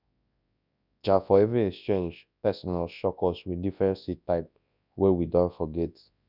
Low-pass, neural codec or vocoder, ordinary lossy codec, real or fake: 5.4 kHz; codec, 24 kHz, 0.9 kbps, WavTokenizer, large speech release; none; fake